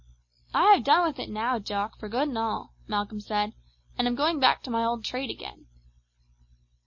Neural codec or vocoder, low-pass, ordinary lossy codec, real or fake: none; 7.2 kHz; MP3, 32 kbps; real